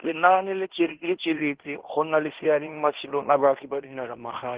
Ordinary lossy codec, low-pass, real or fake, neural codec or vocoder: Opus, 16 kbps; 3.6 kHz; fake; codec, 16 kHz in and 24 kHz out, 0.9 kbps, LongCat-Audio-Codec, fine tuned four codebook decoder